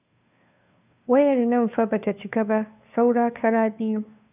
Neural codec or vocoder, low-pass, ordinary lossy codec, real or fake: codec, 16 kHz, 2 kbps, FunCodec, trained on Chinese and English, 25 frames a second; 3.6 kHz; none; fake